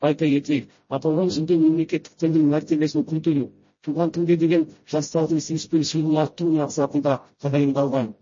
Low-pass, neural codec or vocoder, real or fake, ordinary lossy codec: 7.2 kHz; codec, 16 kHz, 0.5 kbps, FreqCodec, smaller model; fake; MP3, 32 kbps